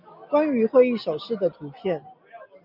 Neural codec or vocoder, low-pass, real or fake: none; 5.4 kHz; real